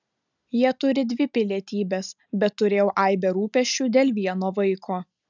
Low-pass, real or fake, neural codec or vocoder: 7.2 kHz; real; none